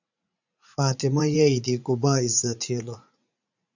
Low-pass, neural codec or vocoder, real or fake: 7.2 kHz; vocoder, 44.1 kHz, 80 mel bands, Vocos; fake